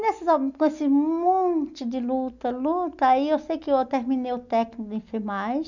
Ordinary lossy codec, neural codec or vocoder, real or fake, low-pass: none; none; real; 7.2 kHz